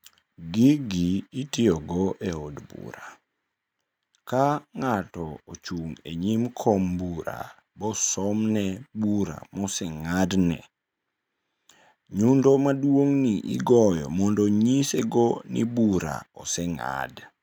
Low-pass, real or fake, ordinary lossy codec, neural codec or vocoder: none; real; none; none